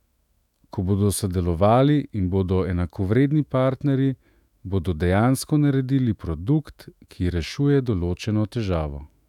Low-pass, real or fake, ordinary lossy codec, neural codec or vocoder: 19.8 kHz; fake; none; autoencoder, 48 kHz, 128 numbers a frame, DAC-VAE, trained on Japanese speech